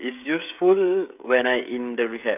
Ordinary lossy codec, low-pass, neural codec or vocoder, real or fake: none; 3.6 kHz; codec, 16 kHz, 16 kbps, FreqCodec, smaller model; fake